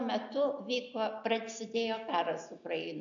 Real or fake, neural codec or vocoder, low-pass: real; none; 7.2 kHz